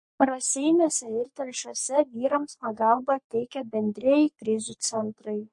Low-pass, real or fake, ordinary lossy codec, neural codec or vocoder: 10.8 kHz; fake; MP3, 48 kbps; codec, 44.1 kHz, 3.4 kbps, Pupu-Codec